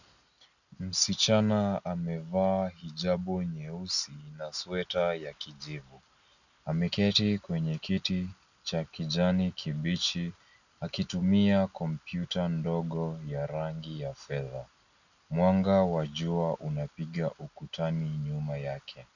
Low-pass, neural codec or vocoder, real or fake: 7.2 kHz; none; real